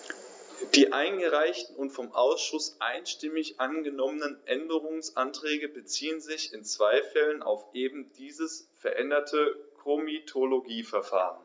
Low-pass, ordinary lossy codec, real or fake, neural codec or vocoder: none; none; real; none